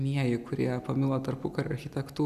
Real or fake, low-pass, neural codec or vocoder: real; 14.4 kHz; none